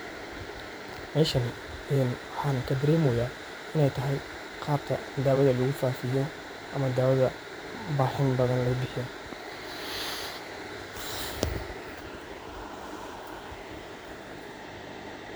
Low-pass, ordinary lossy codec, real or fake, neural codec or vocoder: none; none; fake; vocoder, 44.1 kHz, 128 mel bands every 512 samples, BigVGAN v2